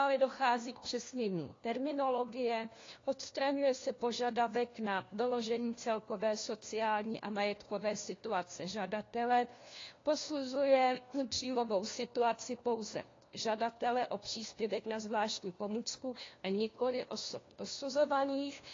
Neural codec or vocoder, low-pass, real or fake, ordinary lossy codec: codec, 16 kHz, 1 kbps, FunCodec, trained on LibriTTS, 50 frames a second; 7.2 kHz; fake; AAC, 32 kbps